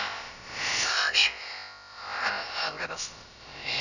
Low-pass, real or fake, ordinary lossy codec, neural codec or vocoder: 7.2 kHz; fake; none; codec, 16 kHz, about 1 kbps, DyCAST, with the encoder's durations